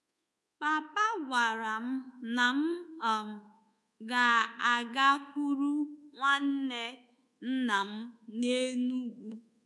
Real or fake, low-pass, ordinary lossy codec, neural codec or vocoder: fake; none; none; codec, 24 kHz, 1.2 kbps, DualCodec